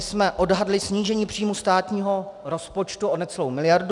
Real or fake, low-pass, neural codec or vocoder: real; 10.8 kHz; none